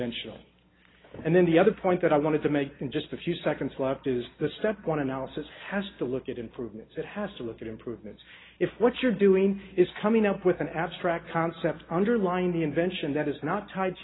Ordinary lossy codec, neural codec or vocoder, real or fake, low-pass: AAC, 16 kbps; none; real; 7.2 kHz